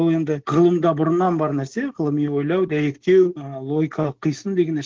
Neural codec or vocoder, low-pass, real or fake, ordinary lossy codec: none; 7.2 kHz; real; Opus, 16 kbps